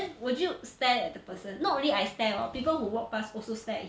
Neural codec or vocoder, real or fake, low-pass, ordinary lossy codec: none; real; none; none